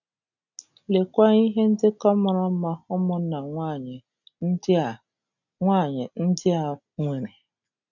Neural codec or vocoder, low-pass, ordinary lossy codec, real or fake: none; 7.2 kHz; none; real